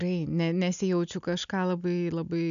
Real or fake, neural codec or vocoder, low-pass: real; none; 7.2 kHz